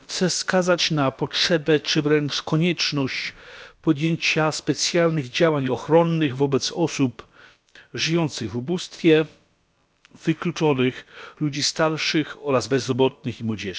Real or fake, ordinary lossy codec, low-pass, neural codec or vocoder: fake; none; none; codec, 16 kHz, about 1 kbps, DyCAST, with the encoder's durations